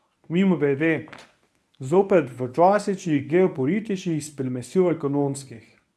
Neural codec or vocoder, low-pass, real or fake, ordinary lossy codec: codec, 24 kHz, 0.9 kbps, WavTokenizer, medium speech release version 1; none; fake; none